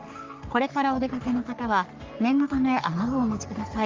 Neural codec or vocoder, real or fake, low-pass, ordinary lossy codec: codec, 44.1 kHz, 3.4 kbps, Pupu-Codec; fake; 7.2 kHz; Opus, 32 kbps